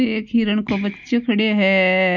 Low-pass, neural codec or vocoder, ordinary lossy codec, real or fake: 7.2 kHz; none; none; real